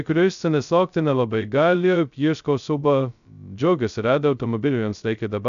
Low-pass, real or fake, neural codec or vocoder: 7.2 kHz; fake; codec, 16 kHz, 0.2 kbps, FocalCodec